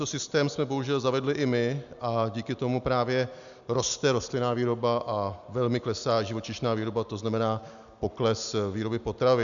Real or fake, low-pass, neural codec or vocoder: real; 7.2 kHz; none